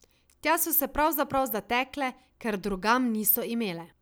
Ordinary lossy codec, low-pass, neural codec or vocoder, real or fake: none; none; none; real